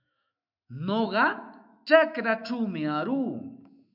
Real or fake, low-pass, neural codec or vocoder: fake; 5.4 kHz; autoencoder, 48 kHz, 128 numbers a frame, DAC-VAE, trained on Japanese speech